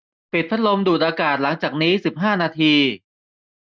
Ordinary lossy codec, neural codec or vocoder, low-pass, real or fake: none; none; none; real